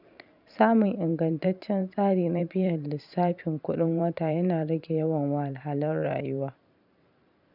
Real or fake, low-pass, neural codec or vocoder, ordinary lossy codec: fake; 5.4 kHz; vocoder, 44.1 kHz, 128 mel bands every 512 samples, BigVGAN v2; none